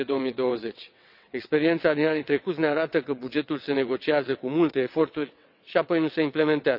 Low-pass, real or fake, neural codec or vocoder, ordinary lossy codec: 5.4 kHz; fake; vocoder, 22.05 kHz, 80 mel bands, WaveNeXt; none